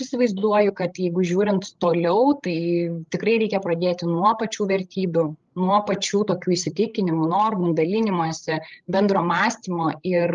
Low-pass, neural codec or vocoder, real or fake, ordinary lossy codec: 7.2 kHz; codec, 16 kHz, 16 kbps, FreqCodec, larger model; fake; Opus, 24 kbps